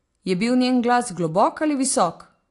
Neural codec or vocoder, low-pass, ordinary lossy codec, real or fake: none; 10.8 kHz; AAC, 48 kbps; real